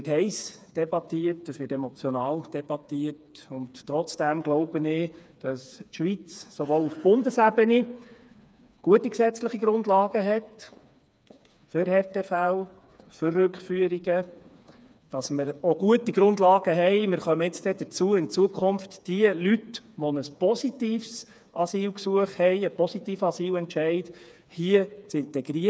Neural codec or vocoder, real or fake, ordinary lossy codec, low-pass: codec, 16 kHz, 4 kbps, FreqCodec, smaller model; fake; none; none